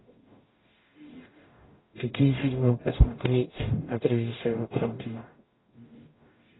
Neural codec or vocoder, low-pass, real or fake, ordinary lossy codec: codec, 44.1 kHz, 0.9 kbps, DAC; 7.2 kHz; fake; AAC, 16 kbps